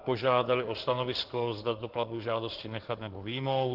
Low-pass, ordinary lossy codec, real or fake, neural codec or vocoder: 5.4 kHz; Opus, 16 kbps; fake; codec, 16 kHz in and 24 kHz out, 2.2 kbps, FireRedTTS-2 codec